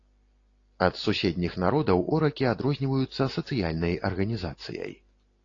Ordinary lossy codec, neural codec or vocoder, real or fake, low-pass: AAC, 32 kbps; none; real; 7.2 kHz